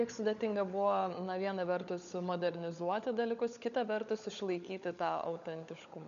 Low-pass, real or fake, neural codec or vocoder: 7.2 kHz; fake; codec, 16 kHz, 16 kbps, FunCodec, trained on LibriTTS, 50 frames a second